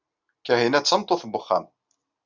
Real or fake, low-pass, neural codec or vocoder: real; 7.2 kHz; none